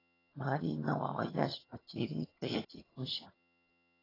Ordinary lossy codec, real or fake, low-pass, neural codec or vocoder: AAC, 24 kbps; fake; 5.4 kHz; vocoder, 22.05 kHz, 80 mel bands, HiFi-GAN